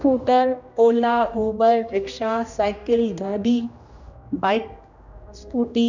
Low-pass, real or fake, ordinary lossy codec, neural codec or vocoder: 7.2 kHz; fake; none; codec, 16 kHz, 1 kbps, X-Codec, HuBERT features, trained on general audio